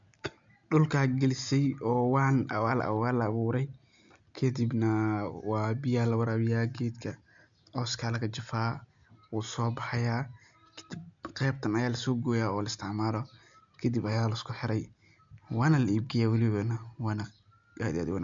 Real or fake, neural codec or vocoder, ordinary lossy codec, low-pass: real; none; MP3, 64 kbps; 7.2 kHz